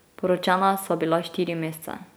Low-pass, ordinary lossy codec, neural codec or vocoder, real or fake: none; none; none; real